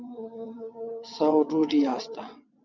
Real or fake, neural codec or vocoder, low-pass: fake; vocoder, 22.05 kHz, 80 mel bands, WaveNeXt; 7.2 kHz